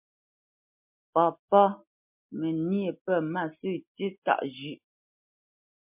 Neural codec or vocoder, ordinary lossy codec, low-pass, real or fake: none; MP3, 32 kbps; 3.6 kHz; real